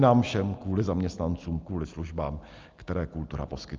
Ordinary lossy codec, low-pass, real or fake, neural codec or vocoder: Opus, 24 kbps; 7.2 kHz; real; none